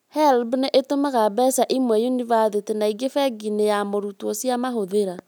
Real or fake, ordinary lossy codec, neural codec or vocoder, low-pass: real; none; none; none